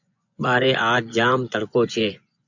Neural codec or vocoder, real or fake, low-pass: vocoder, 24 kHz, 100 mel bands, Vocos; fake; 7.2 kHz